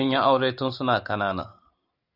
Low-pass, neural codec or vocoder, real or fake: 5.4 kHz; none; real